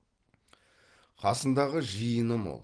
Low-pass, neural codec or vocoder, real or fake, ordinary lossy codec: 9.9 kHz; none; real; Opus, 16 kbps